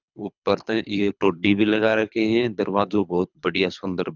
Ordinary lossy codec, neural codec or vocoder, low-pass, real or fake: none; codec, 24 kHz, 3 kbps, HILCodec; 7.2 kHz; fake